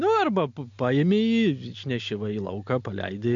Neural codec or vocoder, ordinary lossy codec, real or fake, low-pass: none; MP3, 64 kbps; real; 7.2 kHz